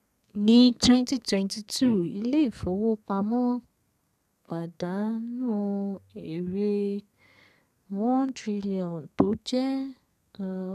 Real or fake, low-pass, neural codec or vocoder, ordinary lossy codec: fake; 14.4 kHz; codec, 32 kHz, 1.9 kbps, SNAC; none